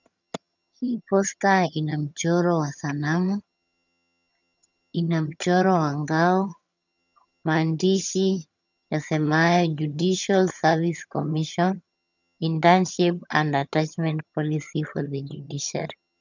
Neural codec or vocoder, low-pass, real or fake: vocoder, 22.05 kHz, 80 mel bands, HiFi-GAN; 7.2 kHz; fake